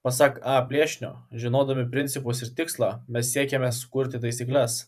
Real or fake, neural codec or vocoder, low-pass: fake; vocoder, 44.1 kHz, 128 mel bands every 512 samples, BigVGAN v2; 14.4 kHz